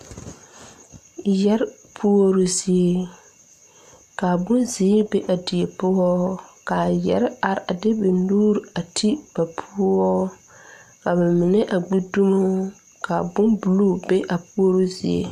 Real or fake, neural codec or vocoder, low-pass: real; none; 14.4 kHz